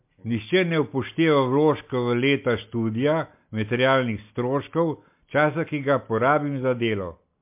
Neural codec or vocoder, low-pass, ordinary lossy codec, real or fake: none; 3.6 kHz; MP3, 32 kbps; real